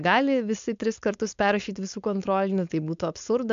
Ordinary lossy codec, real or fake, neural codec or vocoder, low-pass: AAC, 64 kbps; fake; codec, 16 kHz, 4.8 kbps, FACodec; 7.2 kHz